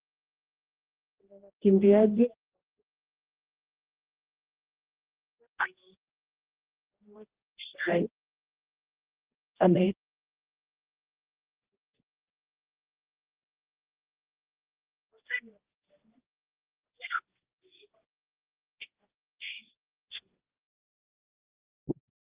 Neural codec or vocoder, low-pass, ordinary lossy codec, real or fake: codec, 16 kHz, 1 kbps, X-Codec, HuBERT features, trained on general audio; 3.6 kHz; Opus, 16 kbps; fake